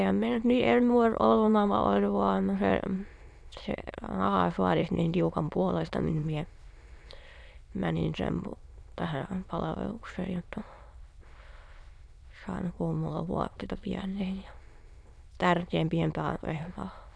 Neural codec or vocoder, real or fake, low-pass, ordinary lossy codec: autoencoder, 22.05 kHz, a latent of 192 numbers a frame, VITS, trained on many speakers; fake; none; none